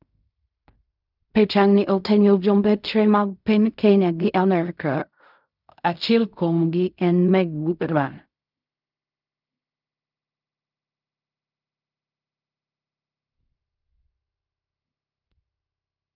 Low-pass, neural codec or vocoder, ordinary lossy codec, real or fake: 5.4 kHz; codec, 16 kHz in and 24 kHz out, 0.4 kbps, LongCat-Audio-Codec, fine tuned four codebook decoder; none; fake